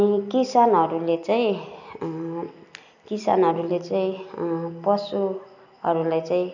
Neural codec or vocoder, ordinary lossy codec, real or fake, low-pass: none; none; real; 7.2 kHz